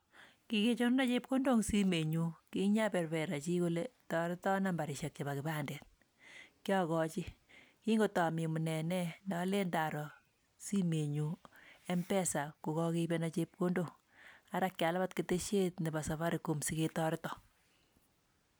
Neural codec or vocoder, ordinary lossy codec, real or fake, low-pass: none; none; real; none